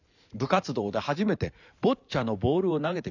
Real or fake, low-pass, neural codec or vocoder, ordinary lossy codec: fake; 7.2 kHz; vocoder, 44.1 kHz, 128 mel bands every 512 samples, BigVGAN v2; none